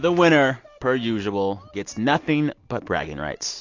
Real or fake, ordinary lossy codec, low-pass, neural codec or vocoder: real; AAC, 48 kbps; 7.2 kHz; none